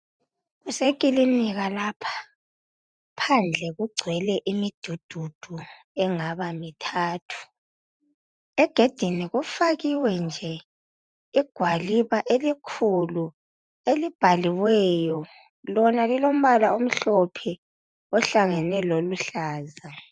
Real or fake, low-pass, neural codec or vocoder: fake; 9.9 kHz; vocoder, 44.1 kHz, 128 mel bands every 512 samples, BigVGAN v2